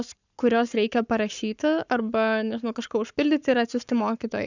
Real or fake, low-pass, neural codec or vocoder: fake; 7.2 kHz; codec, 44.1 kHz, 7.8 kbps, Pupu-Codec